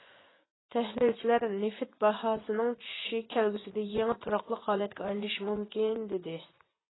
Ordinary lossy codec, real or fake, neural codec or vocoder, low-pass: AAC, 16 kbps; fake; vocoder, 24 kHz, 100 mel bands, Vocos; 7.2 kHz